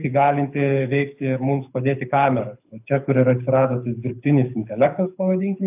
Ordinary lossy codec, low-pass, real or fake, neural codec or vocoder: AAC, 32 kbps; 3.6 kHz; fake; codec, 24 kHz, 6 kbps, HILCodec